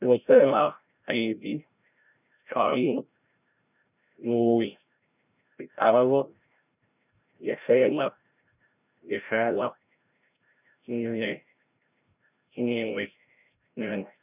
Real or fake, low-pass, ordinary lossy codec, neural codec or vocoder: fake; 3.6 kHz; none; codec, 16 kHz, 0.5 kbps, FreqCodec, larger model